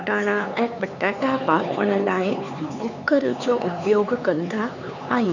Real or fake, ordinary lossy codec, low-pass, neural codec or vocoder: fake; none; 7.2 kHz; codec, 16 kHz, 4 kbps, X-Codec, HuBERT features, trained on LibriSpeech